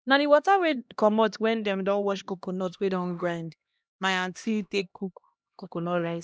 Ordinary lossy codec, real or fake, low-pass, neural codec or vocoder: none; fake; none; codec, 16 kHz, 1 kbps, X-Codec, HuBERT features, trained on LibriSpeech